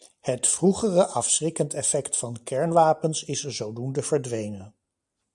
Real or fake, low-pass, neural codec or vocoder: real; 10.8 kHz; none